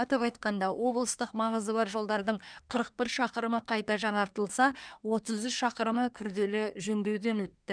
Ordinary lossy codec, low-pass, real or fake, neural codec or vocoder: none; 9.9 kHz; fake; codec, 24 kHz, 1 kbps, SNAC